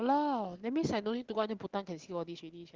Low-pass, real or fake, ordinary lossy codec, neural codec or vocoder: 7.2 kHz; real; Opus, 16 kbps; none